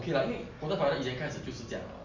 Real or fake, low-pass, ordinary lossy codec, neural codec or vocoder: real; 7.2 kHz; MP3, 64 kbps; none